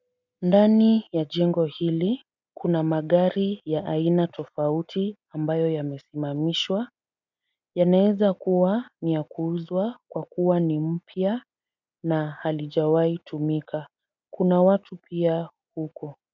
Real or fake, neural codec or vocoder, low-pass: real; none; 7.2 kHz